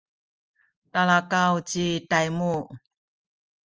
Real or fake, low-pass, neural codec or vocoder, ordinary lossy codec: real; 7.2 kHz; none; Opus, 24 kbps